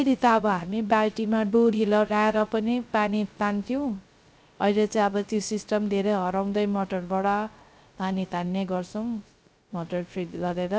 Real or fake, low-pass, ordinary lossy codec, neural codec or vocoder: fake; none; none; codec, 16 kHz, 0.3 kbps, FocalCodec